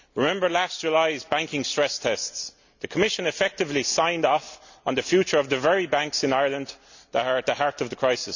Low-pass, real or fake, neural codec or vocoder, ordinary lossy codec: 7.2 kHz; real; none; none